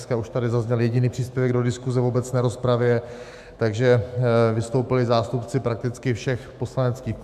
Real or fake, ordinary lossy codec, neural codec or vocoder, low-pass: fake; MP3, 96 kbps; autoencoder, 48 kHz, 128 numbers a frame, DAC-VAE, trained on Japanese speech; 14.4 kHz